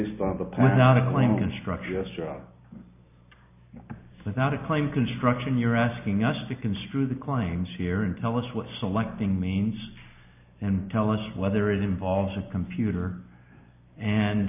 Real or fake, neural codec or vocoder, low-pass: real; none; 3.6 kHz